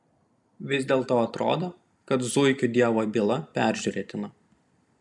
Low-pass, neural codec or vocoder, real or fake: 10.8 kHz; none; real